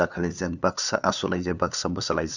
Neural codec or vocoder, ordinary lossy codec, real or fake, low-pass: codec, 16 kHz, 2 kbps, FunCodec, trained on LibriTTS, 25 frames a second; none; fake; 7.2 kHz